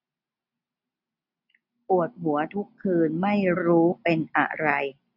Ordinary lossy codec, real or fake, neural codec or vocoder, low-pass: none; real; none; 5.4 kHz